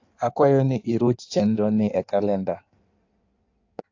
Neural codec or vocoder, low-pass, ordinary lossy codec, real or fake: codec, 16 kHz in and 24 kHz out, 1.1 kbps, FireRedTTS-2 codec; 7.2 kHz; AAC, 48 kbps; fake